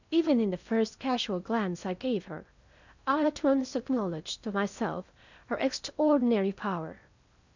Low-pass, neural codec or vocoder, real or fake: 7.2 kHz; codec, 16 kHz in and 24 kHz out, 0.8 kbps, FocalCodec, streaming, 65536 codes; fake